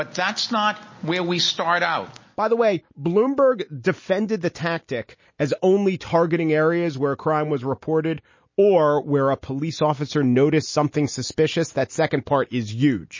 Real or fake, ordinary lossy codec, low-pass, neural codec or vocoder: real; MP3, 32 kbps; 7.2 kHz; none